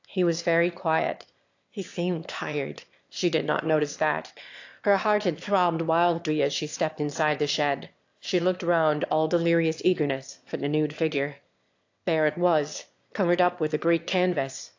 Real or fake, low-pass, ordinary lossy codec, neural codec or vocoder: fake; 7.2 kHz; AAC, 48 kbps; autoencoder, 22.05 kHz, a latent of 192 numbers a frame, VITS, trained on one speaker